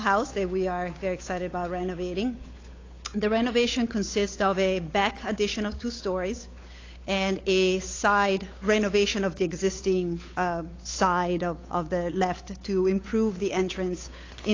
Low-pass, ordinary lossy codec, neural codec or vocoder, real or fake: 7.2 kHz; AAC, 32 kbps; codec, 16 kHz, 8 kbps, FunCodec, trained on Chinese and English, 25 frames a second; fake